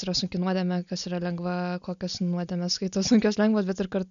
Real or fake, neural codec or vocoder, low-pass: real; none; 7.2 kHz